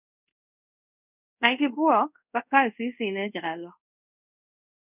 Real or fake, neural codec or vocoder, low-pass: fake; codec, 24 kHz, 0.5 kbps, DualCodec; 3.6 kHz